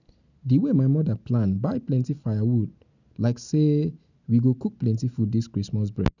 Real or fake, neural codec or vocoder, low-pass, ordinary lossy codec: real; none; 7.2 kHz; none